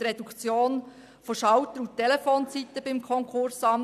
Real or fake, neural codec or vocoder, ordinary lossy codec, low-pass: real; none; AAC, 96 kbps; 14.4 kHz